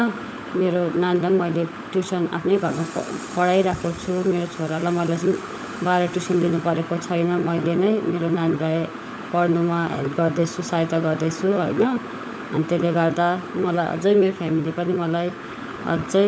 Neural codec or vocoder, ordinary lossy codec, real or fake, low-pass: codec, 16 kHz, 16 kbps, FunCodec, trained on LibriTTS, 50 frames a second; none; fake; none